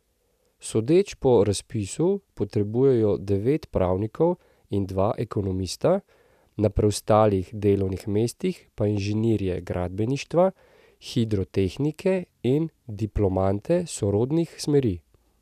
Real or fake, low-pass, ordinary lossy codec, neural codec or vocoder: real; 14.4 kHz; none; none